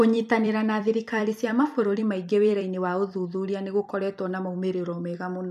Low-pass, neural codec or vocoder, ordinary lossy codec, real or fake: 14.4 kHz; vocoder, 44.1 kHz, 128 mel bands every 256 samples, BigVGAN v2; none; fake